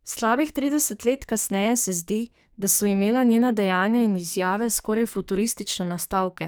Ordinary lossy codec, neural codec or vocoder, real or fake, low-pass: none; codec, 44.1 kHz, 2.6 kbps, SNAC; fake; none